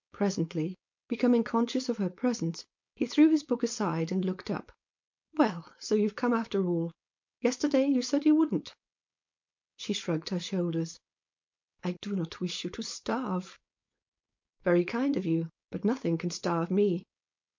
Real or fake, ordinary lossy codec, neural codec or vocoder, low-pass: fake; MP3, 48 kbps; codec, 16 kHz, 4.8 kbps, FACodec; 7.2 kHz